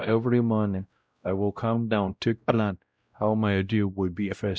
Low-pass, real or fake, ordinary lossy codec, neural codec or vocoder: none; fake; none; codec, 16 kHz, 0.5 kbps, X-Codec, WavLM features, trained on Multilingual LibriSpeech